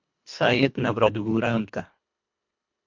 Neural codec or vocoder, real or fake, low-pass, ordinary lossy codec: codec, 24 kHz, 1.5 kbps, HILCodec; fake; 7.2 kHz; MP3, 64 kbps